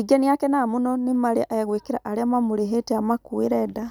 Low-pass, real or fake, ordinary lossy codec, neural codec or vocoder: none; real; none; none